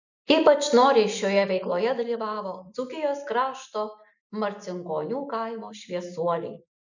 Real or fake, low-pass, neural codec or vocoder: real; 7.2 kHz; none